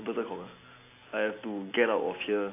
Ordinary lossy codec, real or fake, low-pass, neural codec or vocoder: AAC, 24 kbps; real; 3.6 kHz; none